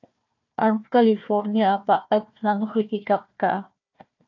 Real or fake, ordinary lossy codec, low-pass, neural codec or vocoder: fake; AAC, 48 kbps; 7.2 kHz; codec, 16 kHz, 1 kbps, FunCodec, trained on Chinese and English, 50 frames a second